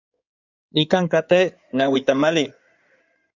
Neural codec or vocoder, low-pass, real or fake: codec, 16 kHz in and 24 kHz out, 2.2 kbps, FireRedTTS-2 codec; 7.2 kHz; fake